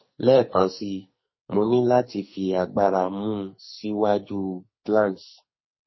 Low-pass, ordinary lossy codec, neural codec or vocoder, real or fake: 7.2 kHz; MP3, 24 kbps; codec, 44.1 kHz, 2.6 kbps, SNAC; fake